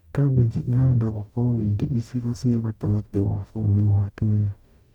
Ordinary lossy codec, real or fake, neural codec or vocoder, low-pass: none; fake; codec, 44.1 kHz, 0.9 kbps, DAC; 19.8 kHz